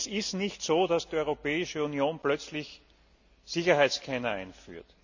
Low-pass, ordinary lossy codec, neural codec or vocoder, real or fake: 7.2 kHz; none; none; real